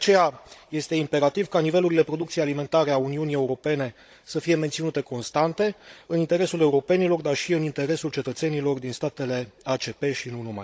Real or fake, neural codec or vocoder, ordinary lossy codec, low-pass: fake; codec, 16 kHz, 16 kbps, FunCodec, trained on Chinese and English, 50 frames a second; none; none